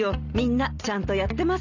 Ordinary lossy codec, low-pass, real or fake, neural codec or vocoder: none; 7.2 kHz; real; none